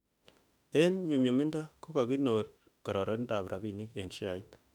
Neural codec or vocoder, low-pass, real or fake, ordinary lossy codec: autoencoder, 48 kHz, 32 numbers a frame, DAC-VAE, trained on Japanese speech; 19.8 kHz; fake; none